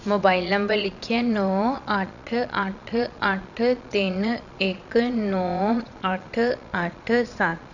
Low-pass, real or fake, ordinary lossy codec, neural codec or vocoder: 7.2 kHz; fake; none; vocoder, 22.05 kHz, 80 mel bands, Vocos